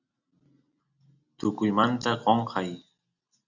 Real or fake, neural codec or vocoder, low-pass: real; none; 7.2 kHz